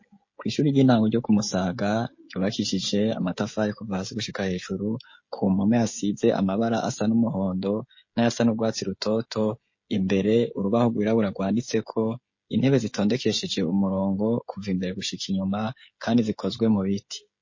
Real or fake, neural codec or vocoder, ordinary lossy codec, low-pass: fake; codec, 16 kHz, 16 kbps, FreqCodec, smaller model; MP3, 32 kbps; 7.2 kHz